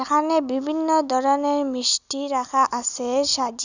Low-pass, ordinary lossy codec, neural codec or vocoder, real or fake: 7.2 kHz; none; none; real